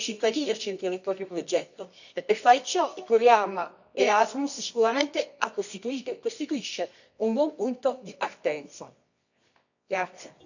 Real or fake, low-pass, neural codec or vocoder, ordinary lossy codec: fake; 7.2 kHz; codec, 24 kHz, 0.9 kbps, WavTokenizer, medium music audio release; none